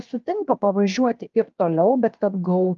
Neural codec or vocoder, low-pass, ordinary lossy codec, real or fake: codec, 16 kHz, 0.7 kbps, FocalCodec; 7.2 kHz; Opus, 32 kbps; fake